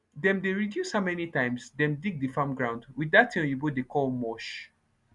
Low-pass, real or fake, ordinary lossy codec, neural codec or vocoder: 10.8 kHz; real; none; none